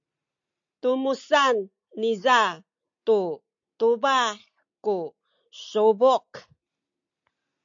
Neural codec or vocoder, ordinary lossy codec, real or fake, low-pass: none; AAC, 64 kbps; real; 7.2 kHz